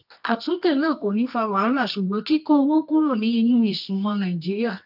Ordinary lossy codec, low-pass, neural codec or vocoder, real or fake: AAC, 48 kbps; 5.4 kHz; codec, 24 kHz, 0.9 kbps, WavTokenizer, medium music audio release; fake